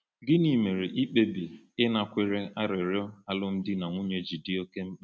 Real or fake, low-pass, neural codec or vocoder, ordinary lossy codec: real; none; none; none